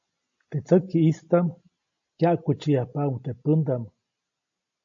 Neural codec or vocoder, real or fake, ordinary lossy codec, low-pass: none; real; AAC, 64 kbps; 7.2 kHz